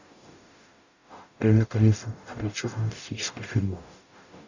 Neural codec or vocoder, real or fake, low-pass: codec, 44.1 kHz, 0.9 kbps, DAC; fake; 7.2 kHz